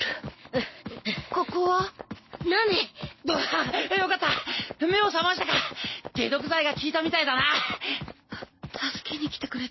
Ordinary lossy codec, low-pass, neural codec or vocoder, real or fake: MP3, 24 kbps; 7.2 kHz; none; real